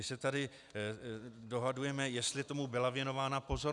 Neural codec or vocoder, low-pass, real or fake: none; 10.8 kHz; real